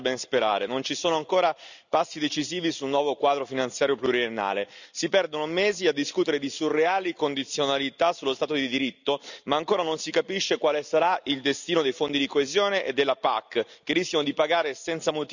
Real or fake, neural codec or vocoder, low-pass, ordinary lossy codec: real; none; 7.2 kHz; none